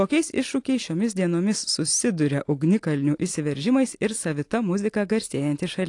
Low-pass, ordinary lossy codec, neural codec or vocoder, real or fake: 10.8 kHz; AAC, 48 kbps; none; real